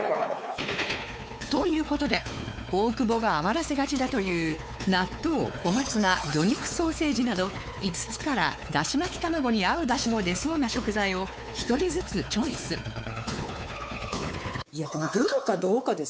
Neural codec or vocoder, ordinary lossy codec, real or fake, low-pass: codec, 16 kHz, 4 kbps, X-Codec, WavLM features, trained on Multilingual LibriSpeech; none; fake; none